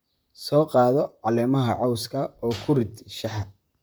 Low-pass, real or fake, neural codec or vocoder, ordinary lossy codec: none; fake; vocoder, 44.1 kHz, 128 mel bands, Pupu-Vocoder; none